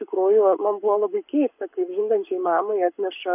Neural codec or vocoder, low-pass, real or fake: codec, 44.1 kHz, 7.8 kbps, Pupu-Codec; 3.6 kHz; fake